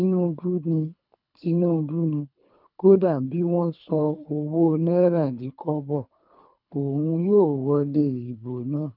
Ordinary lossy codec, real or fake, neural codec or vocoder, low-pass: none; fake; codec, 24 kHz, 3 kbps, HILCodec; 5.4 kHz